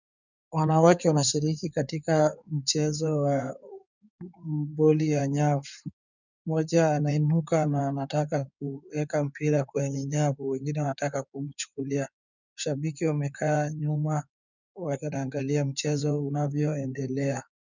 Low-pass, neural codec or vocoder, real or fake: 7.2 kHz; codec, 16 kHz in and 24 kHz out, 2.2 kbps, FireRedTTS-2 codec; fake